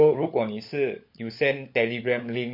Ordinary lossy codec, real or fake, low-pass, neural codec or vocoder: MP3, 32 kbps; fake; 5.4 kHz; codec, 16 kHz, 16 kbps, FunCodec, trained on LibriTTS, 50 frames a second